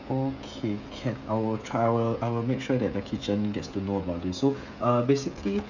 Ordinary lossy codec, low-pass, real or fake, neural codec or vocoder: none; 7.2 kHz; fake; codec, 16 kHz, 16 kbps, FreqCodec, smaller model